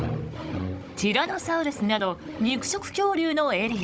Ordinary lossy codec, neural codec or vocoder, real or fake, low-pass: none; codec, 16 kHz, 16 kbps, FunCodec, trained on Chinese and English, 50 frames a second; fake; none